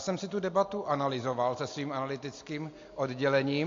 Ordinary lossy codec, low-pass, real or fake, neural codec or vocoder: AAC, 48 kbps; 7.2 kHz; real; none